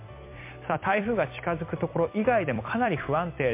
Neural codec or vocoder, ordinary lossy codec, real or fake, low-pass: vocoder, 44.1 kHz, 128 mel bands every 256 samples, BigVGAN v2; MP3, 24 kbps; fake; 3.6 kHz